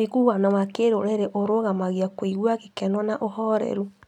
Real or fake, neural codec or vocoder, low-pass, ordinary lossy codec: real; none; 19.8 kHz; none